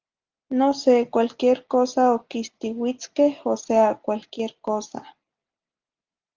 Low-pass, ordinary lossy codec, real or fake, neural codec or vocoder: 7.2 kHz; Opus, 24 kbps; real; none